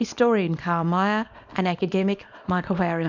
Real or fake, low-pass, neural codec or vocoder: fake; 7.2 kHz; codec, 24 kHz, 0.9 kbps, WavTokenizer, small release